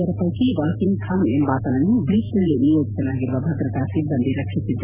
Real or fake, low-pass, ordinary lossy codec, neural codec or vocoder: fake; 3.6 kHz; none; vocoder, 44.1 kHz, 128 mel bands every 512 samples, BigVGAN v2